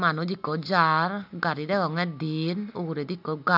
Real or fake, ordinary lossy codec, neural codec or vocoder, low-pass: real; none; none; 5.4 kHz